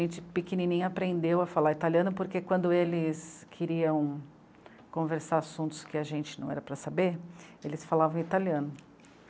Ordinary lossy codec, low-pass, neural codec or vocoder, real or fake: none; none; none; real